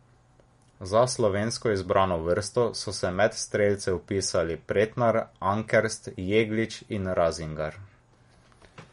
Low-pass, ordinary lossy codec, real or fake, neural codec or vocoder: 10.8 kHz; MP3, 48 kbps; real; none